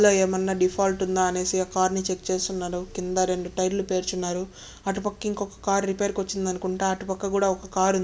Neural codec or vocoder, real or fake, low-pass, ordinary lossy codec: none; real; none; none